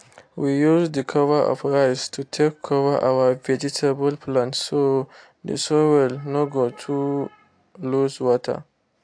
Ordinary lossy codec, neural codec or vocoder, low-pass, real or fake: AAC, 64 kbps; none; 9.9 kHz; real